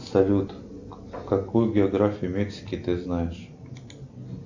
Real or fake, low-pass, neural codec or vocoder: real; 7.2 kHz; none